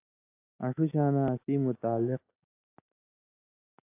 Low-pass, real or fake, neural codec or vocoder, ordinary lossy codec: 3.6 kHz; real; none; AAC, 24 kbps